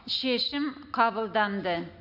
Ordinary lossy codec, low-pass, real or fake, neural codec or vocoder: none; 5.4 kHz; real; none